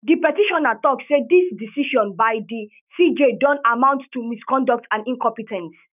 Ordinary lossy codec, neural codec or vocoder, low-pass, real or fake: none; autoencoder, 48 kHz, 128 numbers a frame, DAC-VAE, trained on Japanese speech; 3.6 kHz; fake